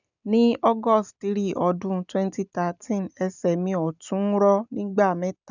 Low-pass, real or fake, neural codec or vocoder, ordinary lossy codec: 7.2 kHz; real; none; none